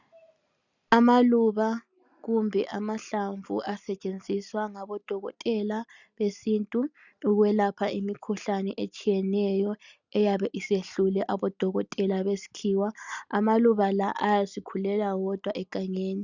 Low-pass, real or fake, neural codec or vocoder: 7.2 kHz; real; none